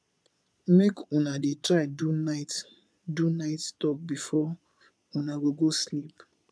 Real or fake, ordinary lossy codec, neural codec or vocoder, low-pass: fake; none; vocoder, 22.05 kHz, 80 mel bands, Vocos; none